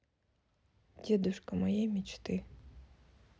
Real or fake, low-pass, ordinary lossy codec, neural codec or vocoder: real; none; none; none